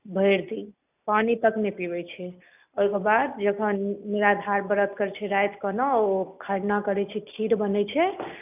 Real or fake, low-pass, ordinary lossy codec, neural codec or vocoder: real; 3.6 kHz; none; none